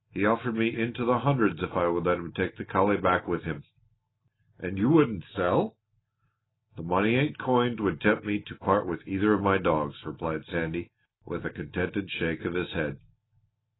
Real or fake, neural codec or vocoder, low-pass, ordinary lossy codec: real; none; 7.2 kHz; AAC, 16 kbps